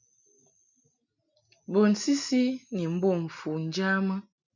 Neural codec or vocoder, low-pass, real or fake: none; 7.2 kHz; real